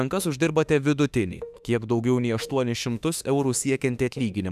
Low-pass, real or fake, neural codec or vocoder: 14.4 kHz; fake; autoencoder, 48 kHz, 32 numbers a frame, DAC-VAE, trained on Japanese speech